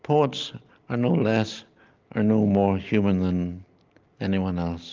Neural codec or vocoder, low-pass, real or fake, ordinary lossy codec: none; 7.2 kHz; real; Opus, 24 kbps